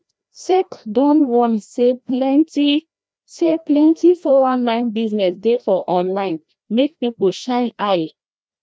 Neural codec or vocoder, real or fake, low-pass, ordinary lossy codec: codec, 16 kHz, 1 kbps, FreqCodec, larger model; fake; none; none